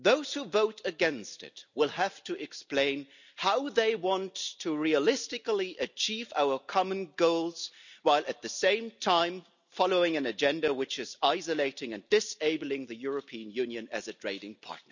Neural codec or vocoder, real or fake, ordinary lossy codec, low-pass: none; real; none; 7.2 kHz